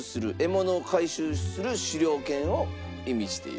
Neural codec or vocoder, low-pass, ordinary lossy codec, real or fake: none; none; none; real